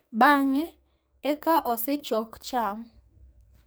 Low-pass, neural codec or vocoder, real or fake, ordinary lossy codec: none; codec, 44.1 kHz, 2.6 kbps, SNAC; fake; none